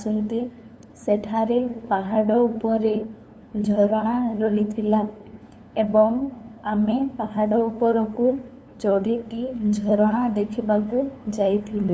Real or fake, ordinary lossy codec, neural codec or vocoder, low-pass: fake; none; codec, 16 kHz, 2 kbps, FunCodec, trained on LibriTTS, 25 frames a second; none